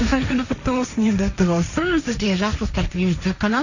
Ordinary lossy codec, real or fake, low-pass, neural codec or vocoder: none; fake; 7.2 kHz; codec, 16 kHz, 1.1 kbps, Voila-Tokenizer